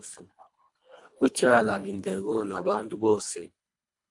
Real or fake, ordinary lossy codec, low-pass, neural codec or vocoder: fake; AAC, 64 kbps; 10.8 kHz; codec, 24 kHz, 1.5 kbps, HILCodec